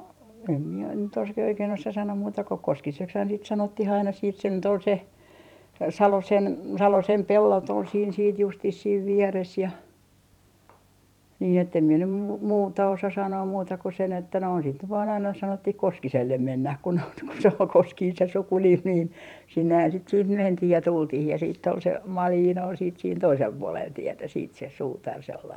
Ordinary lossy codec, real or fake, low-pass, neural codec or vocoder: none; fake; 19.8 kHz; vocoder, 44.1 kHz, 128 mel bands every 512 samples, BigVGAN v2